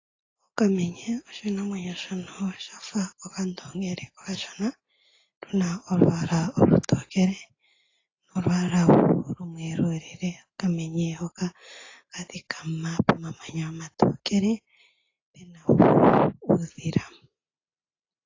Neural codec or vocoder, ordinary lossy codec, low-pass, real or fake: none; AAC, 32 kbps; 7.2 kHz; real